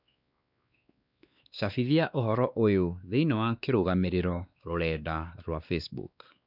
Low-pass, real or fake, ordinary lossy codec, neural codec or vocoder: 5.4 kHz; fake; none; codec, 16 kHz, 2 kbps, X-Codec, WavLM features, trained on Multilingual LibriSpeech